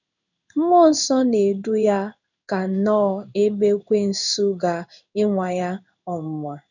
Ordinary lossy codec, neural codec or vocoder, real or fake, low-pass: none; codec, 16 kHz in and 24 kHz out, 1 kbps, XY-Tokenizer; fake; 7.2 kHz